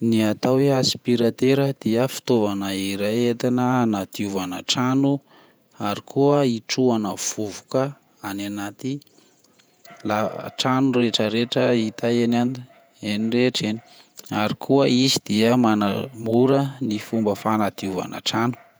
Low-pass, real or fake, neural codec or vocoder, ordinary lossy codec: none; fake; vocoder, 48 kHz, 128 mel bands, Vocos; none